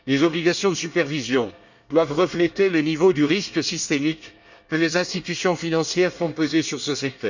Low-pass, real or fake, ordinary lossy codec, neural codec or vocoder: 7.2 kHz; fake; none; codec, 24 kHz, 1 kbps, SNAC